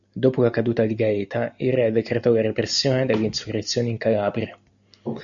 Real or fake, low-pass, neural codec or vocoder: real; 7.2 kHz; none